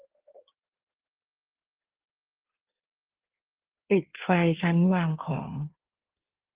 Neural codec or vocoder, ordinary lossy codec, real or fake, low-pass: codec, 16 kHz in and 24 kHz out, 1.1 kbps, FireRedTTS-2 codec; Opus, 16 kbps; fake; 3.6 kHz